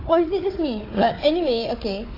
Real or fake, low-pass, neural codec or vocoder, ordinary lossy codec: fake; 5.4 kHz; codec, 16 kHz, 4 kbps, FunCodec, trained on Chinese and English, 50 frames a second; AAC, 32 kbps